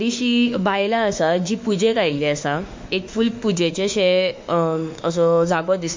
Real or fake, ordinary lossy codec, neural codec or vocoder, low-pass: fake; MP3, 48 kbps; autoencoder, 48 kHz, 32 numbers a frame, DAC-VAE, trained on Japanese speech; 7.2 kHz